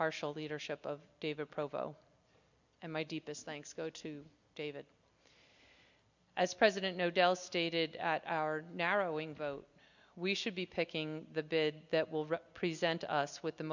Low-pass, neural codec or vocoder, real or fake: 7.2 kHz; none; real